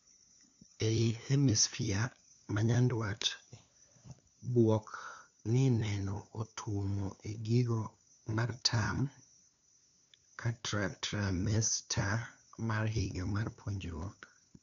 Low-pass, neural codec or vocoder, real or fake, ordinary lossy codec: 7.2 kHz; codec, 16 kHz, 2 kbps, FunCodec, trained on LibriTTS, 25 frames a second; fake; none